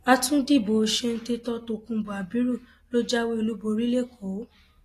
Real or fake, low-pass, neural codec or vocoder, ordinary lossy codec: real; 14.4 kHz; none; AAC, 48 kbps